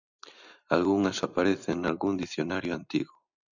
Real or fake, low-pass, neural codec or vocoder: real; 7.2 kHz; none